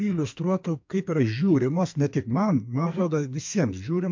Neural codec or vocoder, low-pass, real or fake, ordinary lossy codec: codec, 44.1 kHz, 2.6 kbps, SNAC; 7.2 kHz; fake; MP3, 48 kbps